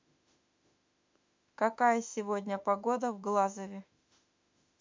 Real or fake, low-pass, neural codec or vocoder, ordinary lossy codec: fake; 7.2 kHz; autoencoder, 48 kHz, 32 numbers a frame, DAC-VAE, trained on Japanese speech; MP3, 64 kbps